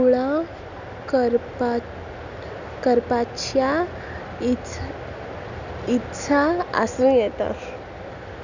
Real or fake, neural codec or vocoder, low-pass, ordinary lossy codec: real; none; 7.2 kHz; none